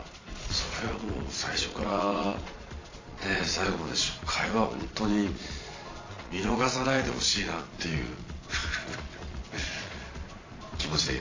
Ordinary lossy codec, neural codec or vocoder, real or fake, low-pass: AAC, 32 kbps; vocoder, 22.05 kHz, 80 mel bands, Vocos; fake; 7.2 kHz